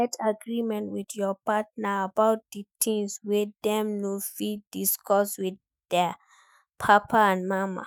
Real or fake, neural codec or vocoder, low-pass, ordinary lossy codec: fake; autoencoder, 48 kHz, 128 numbers a frame, DAC-VAE, trained on Japanese speech; none; none